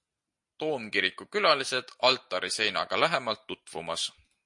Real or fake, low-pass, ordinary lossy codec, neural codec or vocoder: real; 10.8 kHz; MP3, 48 kbps; none